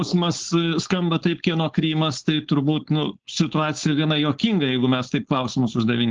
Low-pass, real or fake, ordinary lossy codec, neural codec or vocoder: 7.2 kHz; fake; Opus, 16 kbps; codec, 16 kHz, 4.8 kbps, FACodec